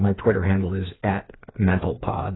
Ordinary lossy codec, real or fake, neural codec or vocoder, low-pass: AAC, 16 kbps; fake; codec, 16 kHz, 4 kbps, FreqCodec, smaller model; 7.2 kHz